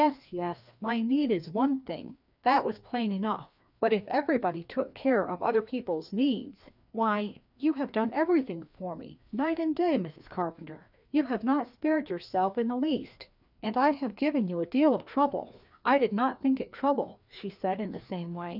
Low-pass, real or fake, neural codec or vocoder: 5.4 kHz; fake; codec, 16 kHz, 2 kbps, FreqCodec, larger model